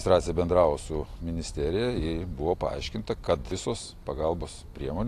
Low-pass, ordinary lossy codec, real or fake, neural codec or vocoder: 14.4 kHz; AAC, 64 kbps; real; none